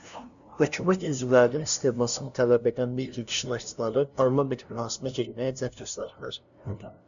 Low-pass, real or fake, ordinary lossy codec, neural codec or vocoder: 7.2 kHz; fake; MP3, 96 kbps; codec, 16 kHz, 0.5 kbps, FunCodec, trained on LibriTTS, 25 frames a second